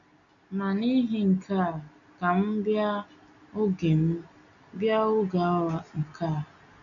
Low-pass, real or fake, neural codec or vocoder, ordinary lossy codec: 7.2 kHz; real; none; none